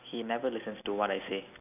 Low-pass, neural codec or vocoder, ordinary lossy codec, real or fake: 3.6 kHz; none; none; real